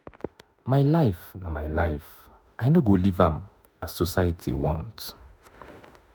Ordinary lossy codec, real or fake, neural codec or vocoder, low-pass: none; fake; autoencoder, 48 kHz, 32 numbers a frame, DAC-VAE, trained on Japanese speech; none